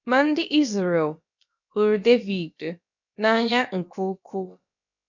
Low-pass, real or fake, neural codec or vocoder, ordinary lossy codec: 7.2 kHz; fake; codec, 16 kHz, about 1 kbps, DyCAST, with the encoder's durations; none